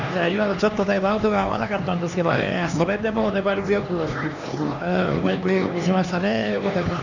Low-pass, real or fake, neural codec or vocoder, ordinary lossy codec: 7.2 kHz; fake; codec, 16 kHz, 2 kbps, X-Codec, HuBERT features, trained on LibriSpeech; none